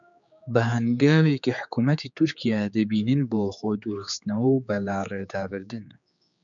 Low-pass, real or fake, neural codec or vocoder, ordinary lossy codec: 7.2 kHz; fake; codec, 16 kHz, 4 kbps, X-Codec, HuBERT features, trained on general audio; MP3, 96 kbps